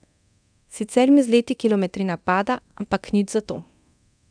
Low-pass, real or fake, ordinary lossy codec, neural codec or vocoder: 9.9 kHz; fake; none; codec, 24 kHz, 0.9 kbps, DualCodec